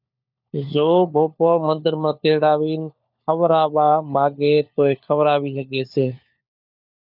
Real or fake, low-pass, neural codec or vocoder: fake; 5.4 kHz; codec, 16 kHz, 4 kbps, FunCodec, trained on LibriTTS, 50 frames a second